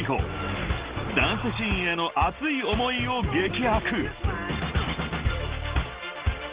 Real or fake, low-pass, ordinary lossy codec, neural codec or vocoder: real; 3.6 kHz; Opus, 16 kbps; none